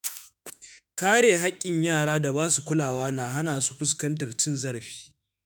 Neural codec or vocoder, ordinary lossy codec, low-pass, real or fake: autoencoder, 48 kHz, 32 numbers a frame, DAC-VAE, trained on Japanese speech; none; none; fake